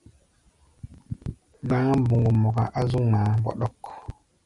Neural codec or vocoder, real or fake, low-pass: none; real; 10.8 kHz